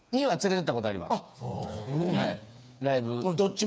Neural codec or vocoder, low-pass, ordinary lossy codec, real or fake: codec, 16 kHz, 4 kbps, FreqCodec, smaller model; none; none; fake